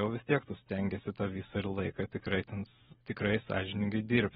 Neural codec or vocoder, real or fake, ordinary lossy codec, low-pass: none; real; AAC, 16 kbps; 19.8 kHz